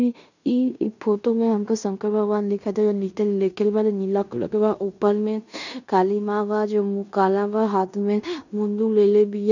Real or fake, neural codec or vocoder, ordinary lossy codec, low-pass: fake; codec, 16 kHz in and 24 kHz out, 0.9 kbps, LongCat-Audio-Codec, fine tuned four codebook decoder; none; 7.2 kHz